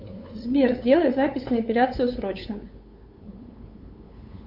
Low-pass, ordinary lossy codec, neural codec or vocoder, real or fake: 5.4 kHz; Opus, 64 kbps; codec, 16 kHz, 8 kbps, FunCodec, trained on LibriTTS, 25 frames a second; fake